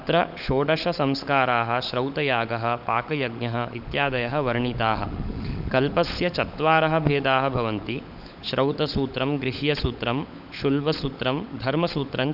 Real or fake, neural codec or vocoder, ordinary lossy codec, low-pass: fake; codec, 16 kHz, 16 kbps, FunCodec, trained on LibriTTS, 50 frames a second; none; 5.4 kHz